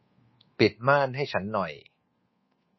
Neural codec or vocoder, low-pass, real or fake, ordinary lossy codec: codec, 24 kHz, 1.2 kbps, DualCodec; 7.2 kHz; fake; MP3, 24 kbps